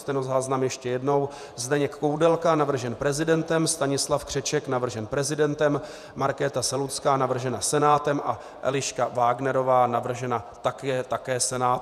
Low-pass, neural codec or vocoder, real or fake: 14.4 kHz; vocoder, 48 kHz, 128 mel bands, Vocos; fake